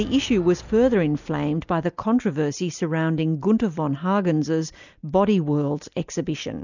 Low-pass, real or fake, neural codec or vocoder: 7.2 kHz; real; none